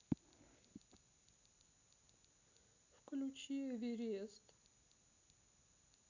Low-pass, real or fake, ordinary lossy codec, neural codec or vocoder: 7.2 kHz; real; none; none